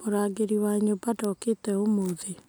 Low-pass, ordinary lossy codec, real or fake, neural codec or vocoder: none; none; real; none